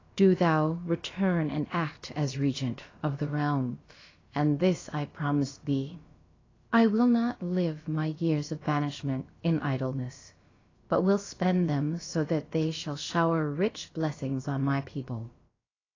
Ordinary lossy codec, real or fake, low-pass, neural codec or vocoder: AAC, 32 kbps; fake; 7.2 kHz; codec, 16 kHz, about 1 kbps, DyCAST, with the encoder's durations